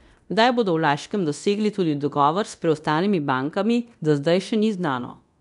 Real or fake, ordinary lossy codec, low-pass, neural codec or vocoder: fake; none; 10.8 kHz; codec, 24 kHz, 0.9 kbps, DualCodec